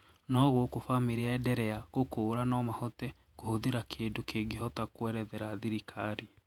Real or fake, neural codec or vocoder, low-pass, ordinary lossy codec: fake; vocoder, 48 kHz, 128 mel bands, Vocos; 19.8 kHz; none